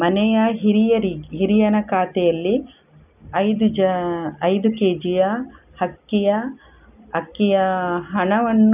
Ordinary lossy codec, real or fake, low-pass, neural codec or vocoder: none; real; 3.6 kHz; none